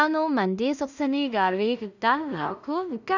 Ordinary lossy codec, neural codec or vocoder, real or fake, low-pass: none; codec, 16 kHz in and 24 kHz out, 0.4 kbps, LongCat-Audio-Codec, two codebook decoder; fake; 7.2 kHz